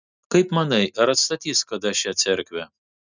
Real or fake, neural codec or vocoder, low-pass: real; none; 7.2 kHz